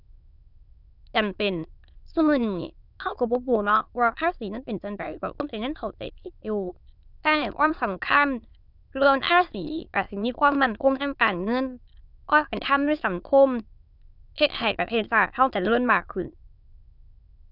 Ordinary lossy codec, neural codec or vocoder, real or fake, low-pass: none; autoencoder, 22.05 kHz, a latent of 192 numbers a frame, VITS, trained on many speakers; fake; 5.4 kHz